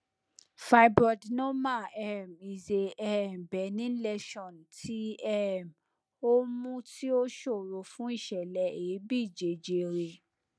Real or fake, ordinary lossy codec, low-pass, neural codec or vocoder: real; none; none; none